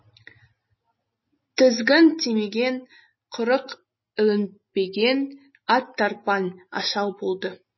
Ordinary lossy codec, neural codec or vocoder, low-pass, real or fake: MP3, 24 kbps; none; 7.2 kHz; real